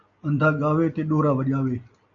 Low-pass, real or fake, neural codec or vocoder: 7.2 kHz; real; none